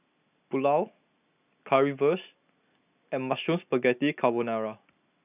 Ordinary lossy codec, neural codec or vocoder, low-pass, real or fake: none; none; 3.6 kHz; real